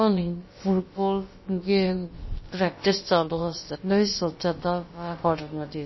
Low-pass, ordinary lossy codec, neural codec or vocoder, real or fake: 7.2 kHz; MP3, 24 kbps; codec, 16 kHz, about 1 kbps, DyCAST, with the encoder's durations; fake